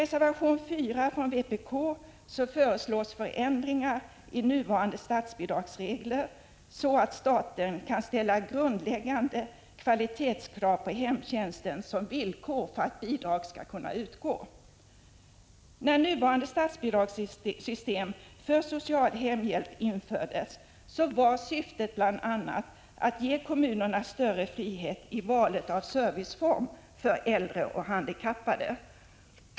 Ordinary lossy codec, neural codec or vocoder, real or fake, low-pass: none; none; real; none